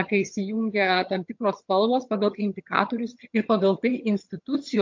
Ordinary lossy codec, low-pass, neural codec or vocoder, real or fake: MP3, 48 kbps; 7.2 kHz; vocoder, 22.05 kHz, 80 mel bands, HiFi-GAN; fake